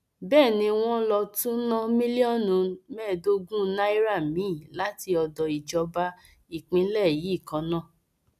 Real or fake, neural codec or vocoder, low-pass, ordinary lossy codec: real; none; 14.4 kHz; none